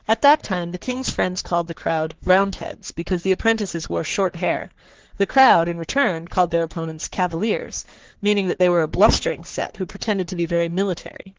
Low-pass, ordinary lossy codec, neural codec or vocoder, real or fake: 7.2 kHz; Opus, 16 kbps; codec, 44.1 kHz, 3.4 kbps, Pupu-Codec; fake